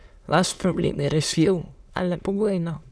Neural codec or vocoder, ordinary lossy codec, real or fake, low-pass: autoencoder, 22.05 kHz, a latent of 192 numbers a frame, VITS, trained on many speakers; none; fake; none